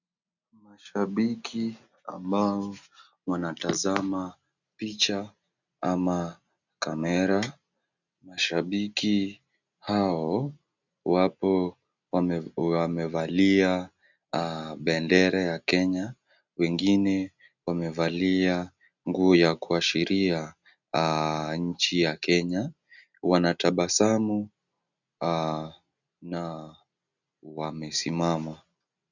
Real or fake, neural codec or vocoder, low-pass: real; none; 7.2 kHz